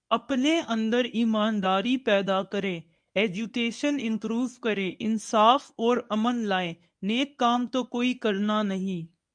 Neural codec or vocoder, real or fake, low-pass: codec, 24 kHz, 0.9 kbps, WavTokenizer, medium speech release version 1; fake; 9.9 kHz